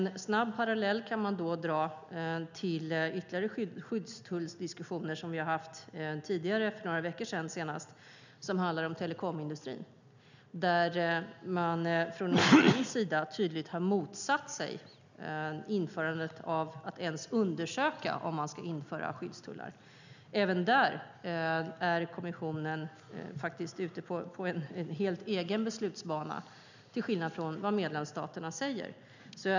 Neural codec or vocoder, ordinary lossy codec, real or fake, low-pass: none; none; real; 7.2 kHz